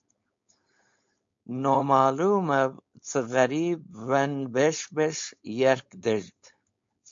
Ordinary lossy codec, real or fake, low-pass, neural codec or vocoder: MP3, 48 kbps; fake; 7.2 kHz; codec, 16 kHz, 4.8 kbps, FACodec